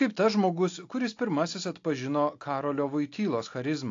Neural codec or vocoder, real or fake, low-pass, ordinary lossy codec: none; real; 7.2 kHz; AAC, 48 kbps